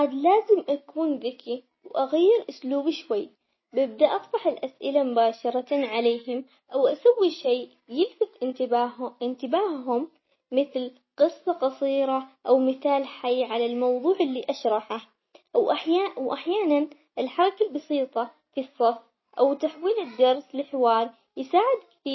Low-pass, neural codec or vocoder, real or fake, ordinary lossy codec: 7.2 kHz; none; real; MP3, 24 kbps